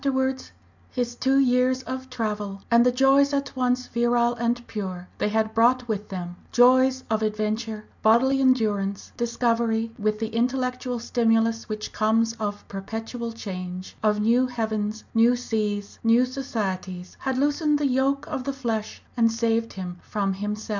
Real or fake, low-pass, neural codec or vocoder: real; 7.2 kHz; none